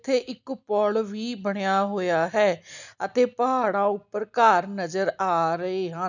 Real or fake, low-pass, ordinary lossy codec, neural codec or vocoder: real; 7.2 kHz; none; none